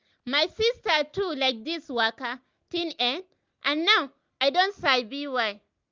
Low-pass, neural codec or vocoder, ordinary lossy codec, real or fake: 7.2 kHz; none; Opus, 24 kbps; real